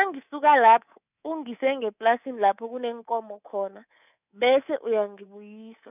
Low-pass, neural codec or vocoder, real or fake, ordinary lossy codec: 3.6 kHz; codec, 24 kHz, 3.1 kbps, DualCodec; fake; none